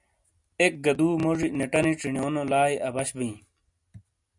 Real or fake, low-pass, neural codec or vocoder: real; 10.8 kHz; none